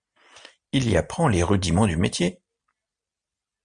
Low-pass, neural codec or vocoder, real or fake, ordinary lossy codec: 9.9 kHz; none; real; MP3, 96 kbps